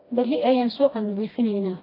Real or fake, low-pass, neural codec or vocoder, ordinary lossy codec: fake; 5.4 kHz; codec, 16 kHz, 1 kbps, FreqCodec, smaller model; AAC, 24 kbps